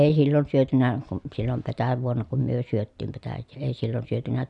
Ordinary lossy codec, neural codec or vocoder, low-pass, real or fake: MP3, 96 kbps; none; 10.8 kHz; real